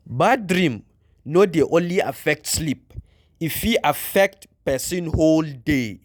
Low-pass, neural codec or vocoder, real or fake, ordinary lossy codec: none; none; real; none